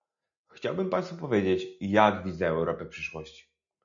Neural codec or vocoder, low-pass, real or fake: none; 7.2 kHz; real